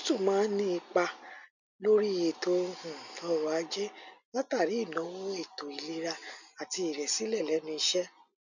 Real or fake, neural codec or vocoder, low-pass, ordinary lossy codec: real; none; 7.2 kHz; none